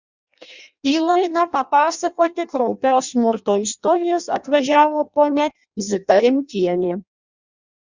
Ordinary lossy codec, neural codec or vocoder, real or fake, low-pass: Opus, 64 kbps; codec, 16 kHz in and 24 kHz out, 0.6 kbps, FireRedTTS-2 codec; fake; 7.2 kHz